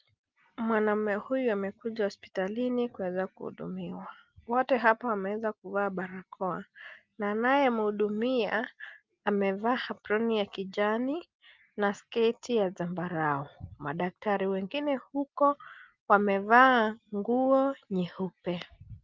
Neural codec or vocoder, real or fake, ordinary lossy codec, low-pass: none; real; Opus, 32 kbps; 7.2 kHz